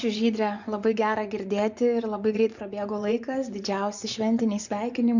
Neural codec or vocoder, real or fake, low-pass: vocoder, 24 kHz, 100 mel bands, Vocos; fake; 7.2 kHz